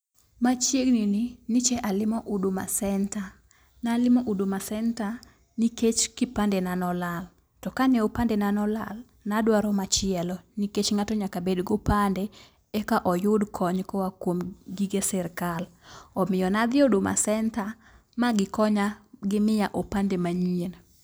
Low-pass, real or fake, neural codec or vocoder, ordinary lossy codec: none; real; none; none